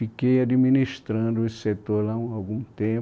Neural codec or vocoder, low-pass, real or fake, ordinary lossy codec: none; none; real; none